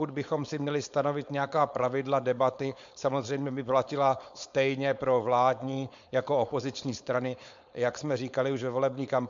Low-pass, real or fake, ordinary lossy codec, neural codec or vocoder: 7.2 kHz; fake; MP3, 64 kbps; codec, 16 kHz, 4.8 kbps, FACodec